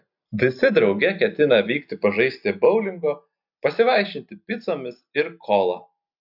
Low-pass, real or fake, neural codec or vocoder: 5.4 kHz; real; none